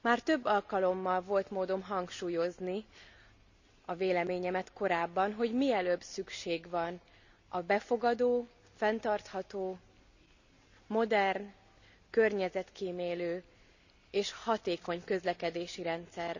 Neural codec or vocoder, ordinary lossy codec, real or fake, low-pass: none; none; real; 7.2 kHz